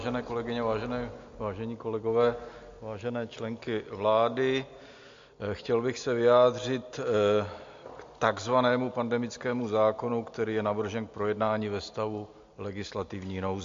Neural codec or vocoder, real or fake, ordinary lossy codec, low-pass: none; real; MP3, 48 kbps; 7.2 kHz